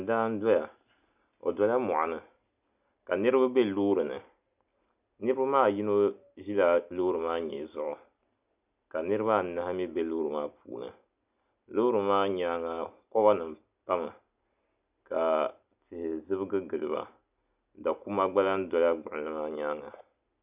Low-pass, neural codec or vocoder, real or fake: 3.6 kHz; none; real